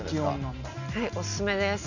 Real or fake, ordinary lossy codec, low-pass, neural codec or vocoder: real; none; 7.2 kHz; none